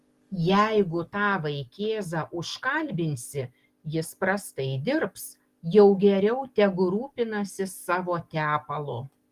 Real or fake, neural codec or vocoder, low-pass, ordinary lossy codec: real; none; 14.4 kHz; Opus, 24 kbps